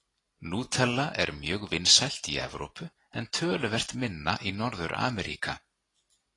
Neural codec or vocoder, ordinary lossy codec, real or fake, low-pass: none; AAC, 32 kbps; real; 10.8 kHz